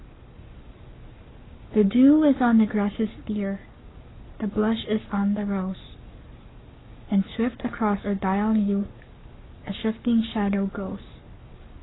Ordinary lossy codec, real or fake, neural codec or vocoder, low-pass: AAC, 16 kbps; fake; codec, 44.1 kHz, 7.8 kbps, Pupu-Codec; 7.2 kHz